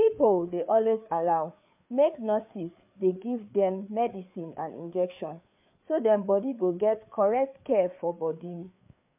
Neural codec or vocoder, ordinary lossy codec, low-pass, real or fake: codec, 16 kHz, 4 kbps, FreqCodec, larger model; MP3, 32 kbps; 3.6 kHz; fake